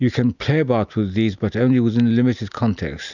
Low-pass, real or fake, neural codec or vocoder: 7.2 kHz; real; none